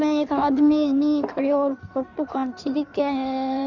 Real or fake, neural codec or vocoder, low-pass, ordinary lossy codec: fake; codec, 16 kHz in and 24 kHz out, 1.1 kbps, FireRedTTS-2 codec; 7.2 kHz; none